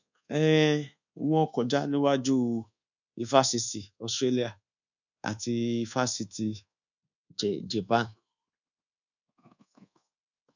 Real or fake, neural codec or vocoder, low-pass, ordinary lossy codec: fake; codec, 24 kHz, 1.2 kbps, DualCodec; 7.2 kHz; none